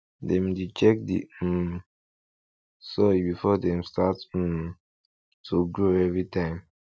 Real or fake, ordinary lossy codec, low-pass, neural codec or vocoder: real; none; none; none